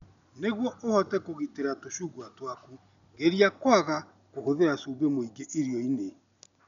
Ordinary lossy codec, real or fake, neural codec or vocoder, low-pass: none; real; none; 7.2 kHz